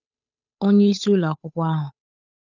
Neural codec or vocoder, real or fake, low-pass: codec, 16 kHz, 8 kbps, FunCodec, trained on Chinese and English, 25 frames a second; fake; 7.2 kHz